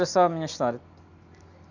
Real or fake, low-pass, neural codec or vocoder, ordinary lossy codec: real; 7.2 kHz; none; none